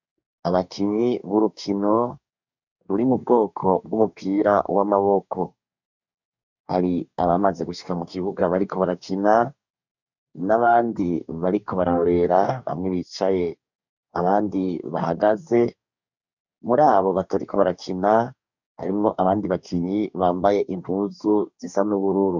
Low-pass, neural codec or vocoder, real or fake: 7.2 kHz; codec, 44.1 kHz, 2.6 kbps, DAC; fake